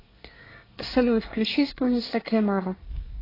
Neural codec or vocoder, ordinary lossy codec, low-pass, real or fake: codec, 24 kHz, 1 kbps, SNAC; AAC, 24 kbps; 5.4 kHz; fake